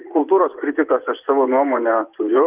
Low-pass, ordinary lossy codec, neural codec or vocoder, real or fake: 3.6 kHz; Opus, 24 kbps; vocoder, 24 kHz, 100 mel bands, Vocos; fake